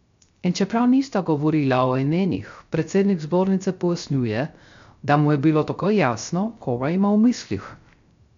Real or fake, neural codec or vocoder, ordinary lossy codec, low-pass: fake; codec, 16 kHz, 0.3 kbps, FocalCodec; MP3, 64 kbps; 7.2 kHz